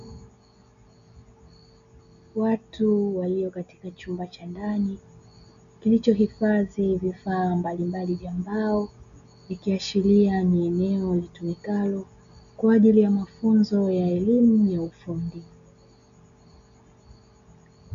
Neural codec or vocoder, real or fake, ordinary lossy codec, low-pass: none; real; AAC, 96 kbps; 7.2 kHz